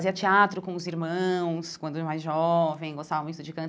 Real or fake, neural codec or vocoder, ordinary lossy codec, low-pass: real; none; none; none